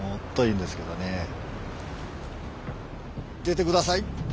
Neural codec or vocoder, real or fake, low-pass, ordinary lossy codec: none; real; none; none